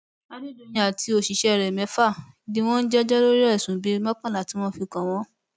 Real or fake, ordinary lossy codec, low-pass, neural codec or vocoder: real; none; none; none